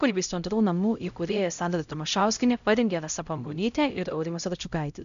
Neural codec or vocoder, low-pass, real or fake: codec, 16 kHz, 0.5 kbps, X-Codec, HuBERT features, trained on LibriSpeech; 7.2 kHz; fake